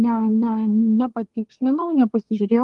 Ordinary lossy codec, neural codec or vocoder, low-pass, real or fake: Opus, 24 kbps; codec, 24 kHz, 1 kbps, SNAC; 10.8 kHz; fake